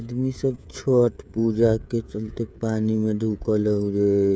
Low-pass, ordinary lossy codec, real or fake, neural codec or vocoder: none; none; fake; codec, 16 kHz, 16 kbps, FreqCodec, smaller model